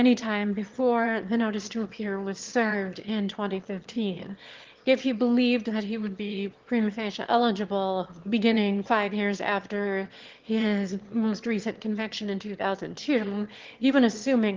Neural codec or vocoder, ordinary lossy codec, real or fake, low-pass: autoencoder, 22.05 kHz, a latent of 192 numbers a frame, VITS, trained on one speaker; Opus, 16 kbps; fake; 7.2 kHz